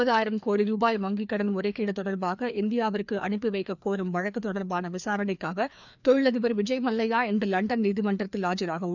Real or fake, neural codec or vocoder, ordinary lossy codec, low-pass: fake; codec, 16 kHz, 2 kbps, FreqCodec, larger model; none; 7.2 kHz